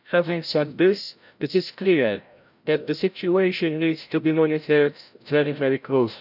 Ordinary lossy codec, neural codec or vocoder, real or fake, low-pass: none; codec, 16 kHz, 0.5 kbps, FreqCodec, larger model; fake; 5.4 kHz